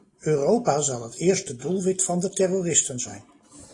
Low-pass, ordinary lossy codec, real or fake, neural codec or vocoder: 10.8 kHz; AAC, 32 kbps; real; none